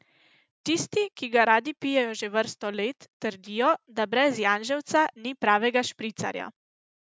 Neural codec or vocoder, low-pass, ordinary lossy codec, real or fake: none; none; none; real